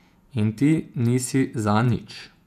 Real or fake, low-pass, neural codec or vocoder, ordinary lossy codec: fake; 14.4 kHz; vocoder, 48 kHz, 128 mel bands, Vocos; none